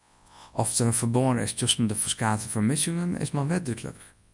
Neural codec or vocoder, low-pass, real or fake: codec, 24 kHz, 0.9 kbps, WavTokenizer, large speech release; 10.8 kHz; fake